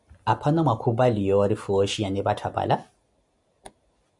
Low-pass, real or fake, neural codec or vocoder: 10.8 kHz; real; none